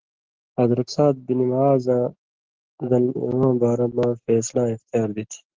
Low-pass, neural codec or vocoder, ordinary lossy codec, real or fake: 7.2 kHz; none; Opus, 16 kbps; real